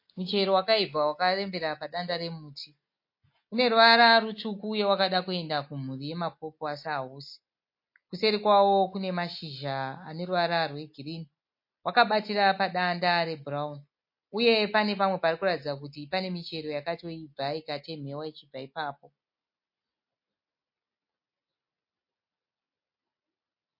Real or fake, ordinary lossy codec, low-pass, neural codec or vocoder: real; MP3, 32 kbps; 5.4 kHz; none